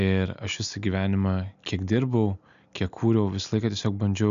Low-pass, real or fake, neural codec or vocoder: 7.2 kHz; real; none